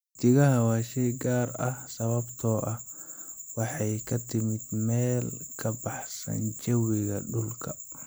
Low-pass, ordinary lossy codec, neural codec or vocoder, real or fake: none; none; none; real